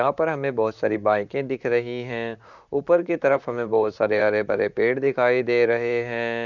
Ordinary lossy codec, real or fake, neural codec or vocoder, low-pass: none; fake; vocoder, 44.1 kHz, 128 mel bands, Pupu-Vocoder; 7.2 kHz